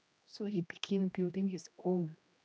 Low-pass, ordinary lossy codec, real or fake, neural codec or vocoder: none; none; fake; codec, 16 kHz, 1 kbps, X-Codec, HuBERT features, trained on general audio